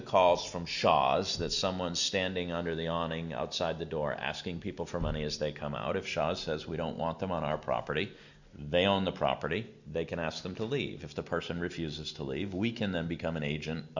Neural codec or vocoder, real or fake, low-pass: none; real; 7.2 kHz